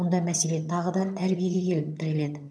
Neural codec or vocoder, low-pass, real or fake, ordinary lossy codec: vocoder, 22.05 kHz, 80 mel bands, HiFi-GAN; none; fake; none